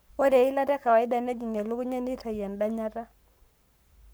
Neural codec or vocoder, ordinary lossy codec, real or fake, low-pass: codec, 44.1 kHz, 7.8 kbps, Pupu-Codec; none; fake; none